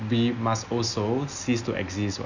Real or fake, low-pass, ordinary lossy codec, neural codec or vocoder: real; 7.2 kHz; none; none